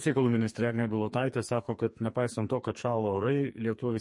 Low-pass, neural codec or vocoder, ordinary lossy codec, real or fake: 10.8 kHz; codec, 44.1 kHz, 2.6 kbps, SNAC; MP3, 48 kbps; fake